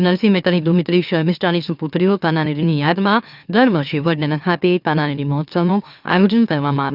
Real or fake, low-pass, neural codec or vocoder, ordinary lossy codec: fake; 5.4 kHz; autoencoder, 44.1 kHz, a latent of 192 numbers a frame, MeloTTS; none